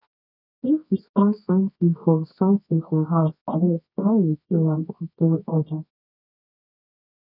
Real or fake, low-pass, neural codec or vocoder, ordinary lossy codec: fake; 5.4 kHz; codec, 24 kHz, 0.9 kbps, WavTokenizer, medium music audio release; none